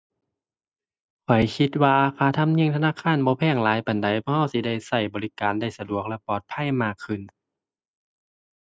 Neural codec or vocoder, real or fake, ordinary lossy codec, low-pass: none; real; none; none